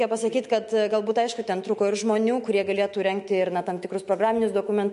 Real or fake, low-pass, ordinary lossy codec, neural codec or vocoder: fake; 14.4 kHz; MP3, 48 kbps; autoencoder, 48 kHz, 128 numbers a frame, DAC-VAE, trained on Japanese speech